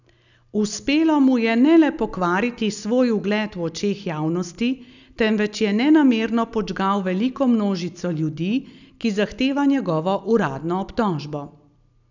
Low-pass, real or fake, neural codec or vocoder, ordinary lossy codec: 7.2 kHz; real; none; none